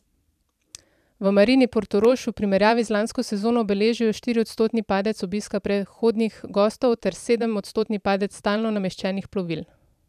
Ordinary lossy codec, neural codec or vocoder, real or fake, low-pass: none; vocoder, 44.1 kHz, 128 mel bands every 512 samples, BigVGAN v2; fake; 14.4 kHz